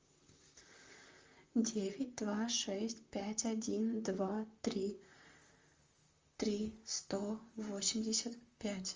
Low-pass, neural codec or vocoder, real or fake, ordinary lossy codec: 7.2 kHz; vocoder, 44.1 kHz, 128 mel bands, Pupu-Vocoder; fake; Opus, 32 kbps